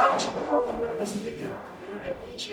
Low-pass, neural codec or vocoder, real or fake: 19.8 kHz; codec, 44.1 kHz, 0.9 kbps, DAC; fake